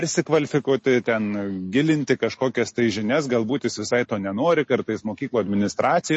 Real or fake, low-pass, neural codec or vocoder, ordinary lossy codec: real; 10.8 kHz; none; MP3, 32 kbps